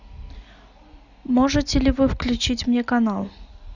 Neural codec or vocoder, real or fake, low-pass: none; real; 7.2 kHz